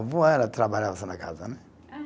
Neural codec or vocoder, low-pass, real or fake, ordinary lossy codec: none; none; real; none